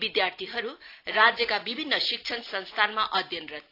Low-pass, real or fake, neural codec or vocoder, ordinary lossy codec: 5.4 kHz; real; none; AAC, 32 kbps